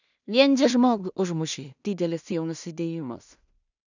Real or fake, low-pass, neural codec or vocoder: fake; 7.2 kHz; codec, 16 kHz in and 24 kHz out, 0.4 kbps, LongCat-Audio-Codec, two codebook decoder